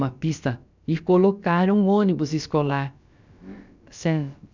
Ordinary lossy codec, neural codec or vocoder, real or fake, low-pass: none; codec, 16 kHz, about 1 kbps, DyCAST, with the encoder's durations; fake; 7.2 kHz